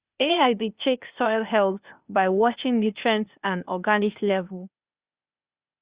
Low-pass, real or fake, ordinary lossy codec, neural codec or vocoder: 3.6 kHz; fake; Opus, 64 kbps; codec, 16 kHz, 0.8 kbps, ZipCodec